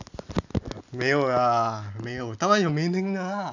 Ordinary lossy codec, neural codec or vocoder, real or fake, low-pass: none; none; real; 7.2 kHz